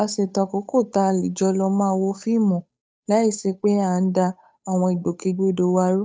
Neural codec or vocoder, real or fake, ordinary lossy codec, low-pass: codec, 16 kHz, 8 kbps, FunCodec, trained on Chinese and English, 25 frames a second; fake; none; none